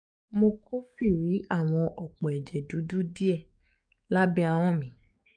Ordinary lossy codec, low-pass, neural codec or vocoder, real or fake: none; 9.9 kHz; codec, 44.1 kHz, 7.8 kbps, DAC; fake